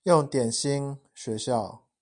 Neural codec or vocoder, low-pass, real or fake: none; 9.9 kHz; real